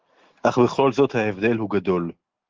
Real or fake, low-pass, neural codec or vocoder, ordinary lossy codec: real; 7.2 kHz; none; Opus, 16 kbps